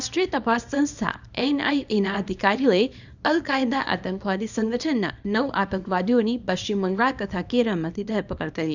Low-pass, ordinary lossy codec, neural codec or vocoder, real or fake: 7.2 kHz; none; codec, 24 kHz, 0.9 kbps, WavTokenizer, small release; fake